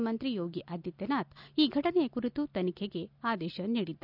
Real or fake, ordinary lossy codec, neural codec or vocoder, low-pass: real; none; none; 5.4 kHz